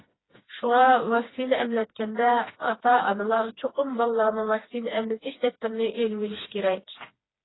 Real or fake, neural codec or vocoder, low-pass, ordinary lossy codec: fake; codec, 16 kHz, 2 kbps, FreqCodec, smaller model; 7.2 kHz; AAC, 16 kbps